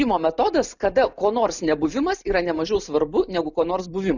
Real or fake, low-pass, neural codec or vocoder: real; 7.2 kHz; none